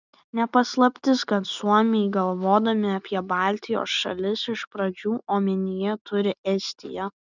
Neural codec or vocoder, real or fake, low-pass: none; real; 7.2 kHz